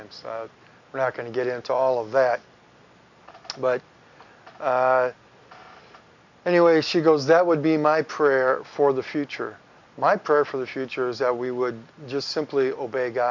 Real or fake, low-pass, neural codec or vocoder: real; 7.2 kHz; none